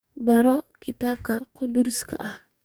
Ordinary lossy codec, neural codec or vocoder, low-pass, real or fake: none; codec, 44.1 kHz, 2.6 kbps, DAC; none; fake